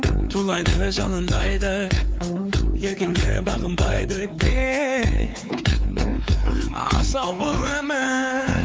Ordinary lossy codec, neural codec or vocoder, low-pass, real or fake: none; codec, 16 kHz, 4 kbps, X-Codec, WavLM features, trained on Multilingual LibriSpeech; none; fake